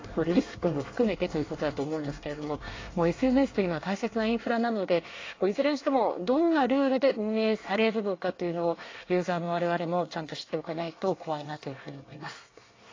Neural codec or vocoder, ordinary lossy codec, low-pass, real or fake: codec, 24 kHz, 1 kbps, SNAC; AAC, 32 kbps; 7.2 kHz; fake